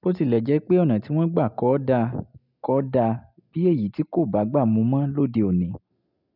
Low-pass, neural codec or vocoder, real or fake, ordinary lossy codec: 5.4 kHz; none; real; none